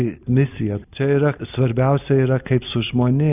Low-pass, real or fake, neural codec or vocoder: 3.6 kHz; real; none